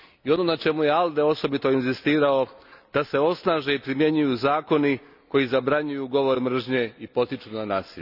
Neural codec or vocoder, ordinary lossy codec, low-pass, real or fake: none; none; 5.4 kHz; real